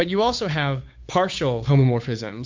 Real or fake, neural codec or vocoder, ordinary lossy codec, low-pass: fake; codec, 16 kHz, 8 kbps, FunCodec, trained on Chinese and English, 25 frames a second; MP3, 48 kbps; 7.2 kHz